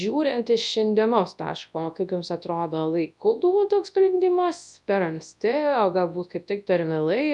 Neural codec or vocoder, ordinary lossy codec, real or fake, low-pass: codec, 24 kHz, 0.9 kbps, WavTokenizer, large speech release; MP3, 96 kbps; fake; 10.8 kHz